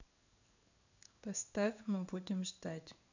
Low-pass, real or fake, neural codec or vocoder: 7.2 kHz; fake; codec, 16 kHz in and 24 kHz out, 1 kbps, XY-Tokenizer